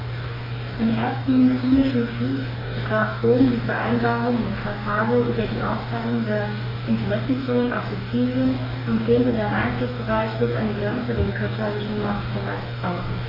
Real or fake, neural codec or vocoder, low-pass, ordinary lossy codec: fake; codec, 44.1 kHz, 2.6 kbps, DAC; 5.4 kHz; none